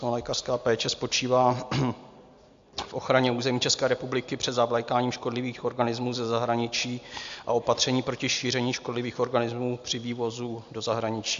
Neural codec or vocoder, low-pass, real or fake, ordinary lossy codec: none; 7.2 kHz; real; AAC, 64 kbps